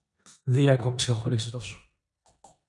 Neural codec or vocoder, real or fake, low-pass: codec, 16 kHz in and 24 kHz out, 0.9 kbps, LongCat-Audio-Codec, four codebook decoder; fake; 10.8 kHz